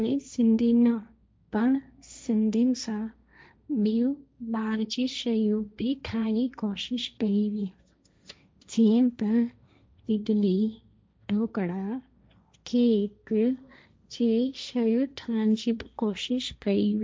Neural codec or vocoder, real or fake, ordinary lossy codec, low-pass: codec, 16 kHz, 1.1 kbps, Voila-Tokenizer; fake; none; none